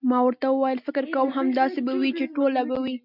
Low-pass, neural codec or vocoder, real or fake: 5.4 kHz; none; real